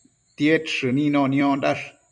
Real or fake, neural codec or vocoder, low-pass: fake; vocoder, 44.1 kHz, 128 mel bands every 256 samples, BigVGAN v2; 10.8 kHz